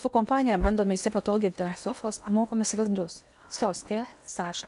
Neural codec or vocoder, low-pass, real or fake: codec, 16 kHz in and 24 kHz out, 0.8 kbps, FocalCodec, streaming, 65536 codes; 10.8 kHz; fake